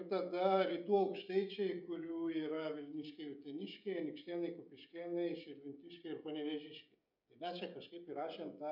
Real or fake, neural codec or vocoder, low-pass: fake; codec, 16 kHz, 16 kbps, FreqCodec, smaller model; 5.4 kHz